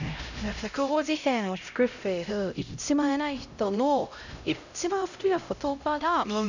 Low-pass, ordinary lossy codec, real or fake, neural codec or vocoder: 7.2 kHz; none; fake; codec, 16 kHz, 0.5 kbps, X-Codec, HuBERT features, trained on LibriSpeech